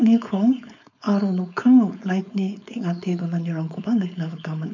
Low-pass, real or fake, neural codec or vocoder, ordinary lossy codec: 7.2 kHz; fake; codec, 16 kHz, 4.8 kbps, FACodec; none